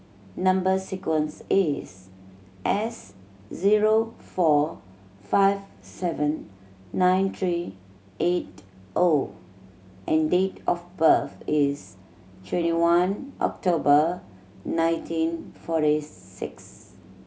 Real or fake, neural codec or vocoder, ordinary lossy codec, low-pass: real; none; none; none